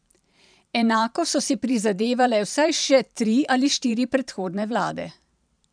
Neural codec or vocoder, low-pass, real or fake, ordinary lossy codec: vocoder, 44.1 kHz, 128 mel bands every 512 samples, BigVGAN v2; 9.9 kHz; fake; none